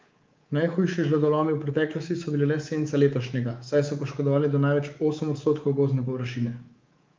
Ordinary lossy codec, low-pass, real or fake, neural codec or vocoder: Opus, 24 kbps; 7.2 kHz; fake; codec, 24 kHz, 3.1 kbps, DualCodec